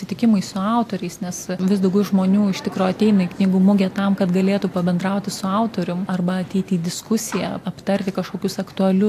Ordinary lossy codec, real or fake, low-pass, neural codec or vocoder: AAC, 64 kbps; real; 14.4 kHz; none